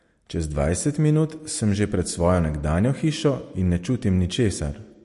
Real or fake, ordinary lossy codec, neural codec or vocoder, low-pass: real; MP3, 48 kbps; none; 14.4 kHz